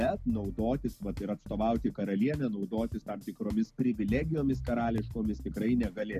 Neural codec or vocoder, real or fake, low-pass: none; real; 14.4 kHz